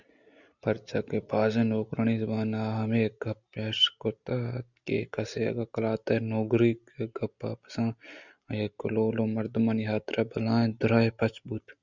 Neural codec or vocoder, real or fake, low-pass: none; real; 7.2 kHz